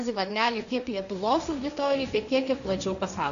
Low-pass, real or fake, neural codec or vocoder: 7.2 kHz; fake; codec, 16 kHz, 1.1 kbps, Voila-Tokenizer